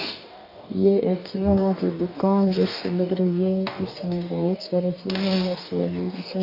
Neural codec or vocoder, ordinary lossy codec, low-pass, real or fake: codec, 44.1 kHz, 2.6 kbps, DAC; none; 5.4 kHz; fake